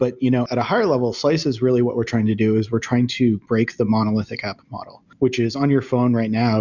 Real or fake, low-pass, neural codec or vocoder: real; 7.2 kHz; none